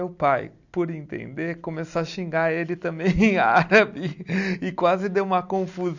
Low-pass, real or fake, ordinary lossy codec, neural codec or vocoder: 7.2 kHz; real; none; none